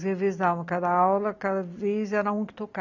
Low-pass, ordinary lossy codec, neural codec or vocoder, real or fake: 7.2 kHz; none; none; real